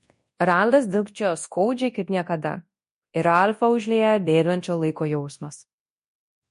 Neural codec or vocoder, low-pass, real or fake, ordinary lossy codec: codec, 24 kHz, 0.9 kbps, WavTokenizer, large speech release; 10.8 kHz; fake; MP3, 48 kbps